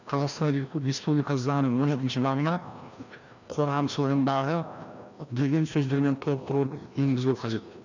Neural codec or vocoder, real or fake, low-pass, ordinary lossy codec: codec, 16 kHz, 1 kbps, FreqCodec, larger model; fake; 7.2 kHz; none